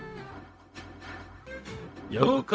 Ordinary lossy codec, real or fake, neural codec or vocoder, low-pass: none; fake; codec, 16 kHz, 0.4 kbps, LongCat-Audio-Codec; none